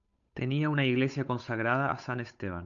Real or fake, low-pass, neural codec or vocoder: fake; 7.2 kHz; codec, 16 kHz, 8 kbps, FunCodec, trained on Chinese and English, 25 frames a second